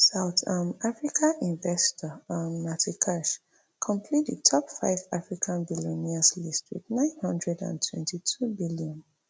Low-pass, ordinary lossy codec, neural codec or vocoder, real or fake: none; none; none; real